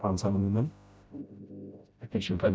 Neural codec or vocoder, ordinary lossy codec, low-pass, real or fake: codec, 16 kHz, 0.5 kbps, FreqCodec, smaller model; none; none; fake